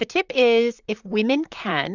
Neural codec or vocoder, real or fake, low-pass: vocoder, 44.1 kHz, 128 mel bands, Pupu-Vocoder; fake; 7.2 kHz